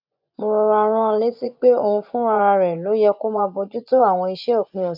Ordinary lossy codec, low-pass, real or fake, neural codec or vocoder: AAC, 48 kbps; 5.4 kHz; fake; vocoder, 24 kHz, 100 mel bands, Vocos